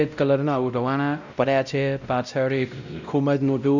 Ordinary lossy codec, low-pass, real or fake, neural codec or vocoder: none; 7.2 kHz; fake; codec, 16 kHz, 0.5 kbps, X-Codec, WavLM features, trained on Multilingual LibriSpeech